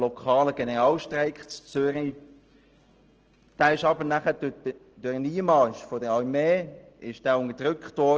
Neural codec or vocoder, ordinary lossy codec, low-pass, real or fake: none; Opus, 16 kbps; 7.2 kHz; real